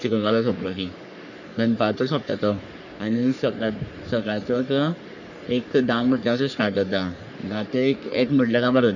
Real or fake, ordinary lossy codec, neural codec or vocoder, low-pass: fake; none; codec, 44.1 kHz, 3.4 kbps, Pupu-Codec; 7.2 kHz